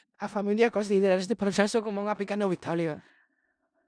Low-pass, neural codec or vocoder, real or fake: 9.9 kHz; codec, 16 kHz in and 24 kHz out, 0.4 kbps, LongCat-Audio-Codec, four codebook decoder; fake